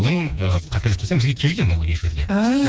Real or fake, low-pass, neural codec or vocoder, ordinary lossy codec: fake; none; codec, 16 kHz, 2 kbps, FreqCodec, smaller model; none